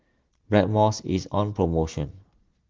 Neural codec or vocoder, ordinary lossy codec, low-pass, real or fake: none; Opus, 16 kbps; 7.2 kHz; real